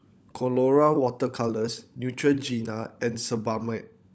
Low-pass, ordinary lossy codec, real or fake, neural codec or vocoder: none; none; fake; codec, 16 kHz, 16 kbps, FunCodec, trained on LibriTTS, 50 frames a second